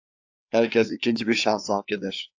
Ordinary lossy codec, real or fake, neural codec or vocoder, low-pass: AAC, 48 kbps; fake; codec, 16 kHz, 4 kbps, FreqCodec, larger model; 7.2 kHz